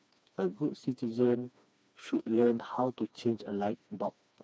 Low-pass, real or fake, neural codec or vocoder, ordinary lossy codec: none; fake; codec, 16 kHz, 2 kbps, FreqCodec, smaller model; none